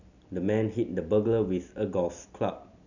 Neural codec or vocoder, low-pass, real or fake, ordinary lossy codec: none; 7.2 kHz; real; none